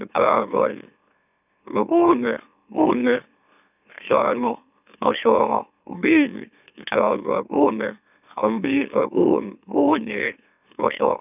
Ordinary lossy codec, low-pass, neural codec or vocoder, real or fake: none; 3.6 kHz; autoencoder, 44.1 kHz, a latent of 192 numbers a frame, MeloTTS; fake